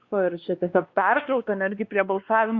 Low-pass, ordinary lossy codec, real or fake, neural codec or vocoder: 7.2 kHz; Opus, 64 kbps; fake; codec, 16 kHz, 2 kbps, X-Codec, WavLM features, trained on Multilingual LibriSpeech